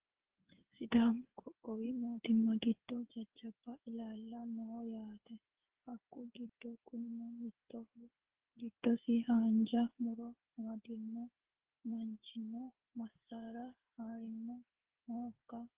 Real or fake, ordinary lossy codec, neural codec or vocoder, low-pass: fake; Opus, 16 kbps; codec, 16 kHz, 8 kbps, FreqCodec, smaller model; 3.6 kHz